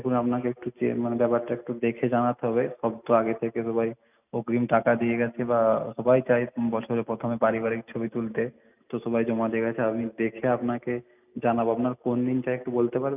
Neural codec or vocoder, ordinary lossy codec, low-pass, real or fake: none; none; 3.6 kHz; real